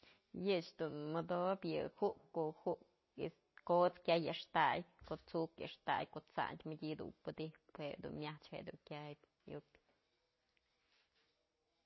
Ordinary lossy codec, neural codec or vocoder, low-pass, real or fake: MP3, 24 kbps; none; 7.2 kHz; real